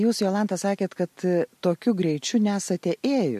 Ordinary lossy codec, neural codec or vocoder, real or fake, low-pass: MP3, 64 kbps; none; real; 14.4 kHz